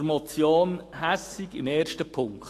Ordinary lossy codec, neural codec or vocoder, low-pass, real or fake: AAC, 48 kbps; autoencoder, 48 kHz, 128 numbers a frame, DAC-VAE, trained on Japanese speech; 14.4 kHz; fake